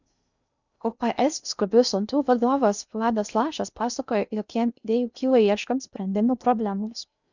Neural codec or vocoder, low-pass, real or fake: codec, 16 kHz in and 24 kHz out, 0.6 kbps, FocalCodec, streaming, 2048 codes; 7.2 kHz; fake